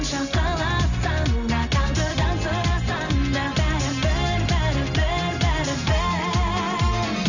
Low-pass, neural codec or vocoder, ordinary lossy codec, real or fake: 7.2 kHz; none; none; real